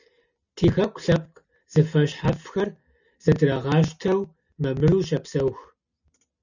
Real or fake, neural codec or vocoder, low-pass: real; none; 7.2 kHz